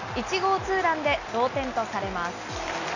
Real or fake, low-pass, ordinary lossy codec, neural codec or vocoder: real; 7.2 kHz; none; none